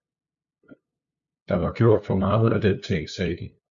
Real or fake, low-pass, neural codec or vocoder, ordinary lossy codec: fake; 7.2 kHz; codec, 16 kHz, 2 kbps, FunCodec, trained on LibriTTS, 25 frames a second; Opus, 64 kbps